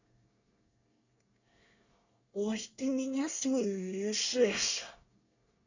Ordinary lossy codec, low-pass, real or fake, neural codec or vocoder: none; 7.2 kHz; fake; codec, 24 kHz, 1 kbps, SNAC